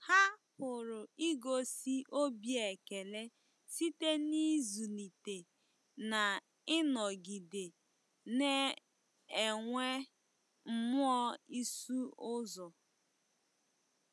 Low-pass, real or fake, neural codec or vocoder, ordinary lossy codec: none; real; none; none